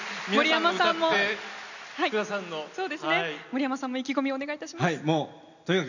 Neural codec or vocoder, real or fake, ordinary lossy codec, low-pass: none; real; none; 7.2 kHz